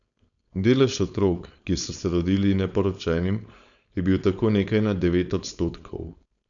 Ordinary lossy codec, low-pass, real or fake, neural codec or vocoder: none; 7.2 kHz; fake; codec, 16 kHz, 4.8 kbps, FACodec